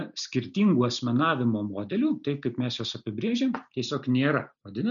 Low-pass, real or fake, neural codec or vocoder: 7.2 kHz; real; none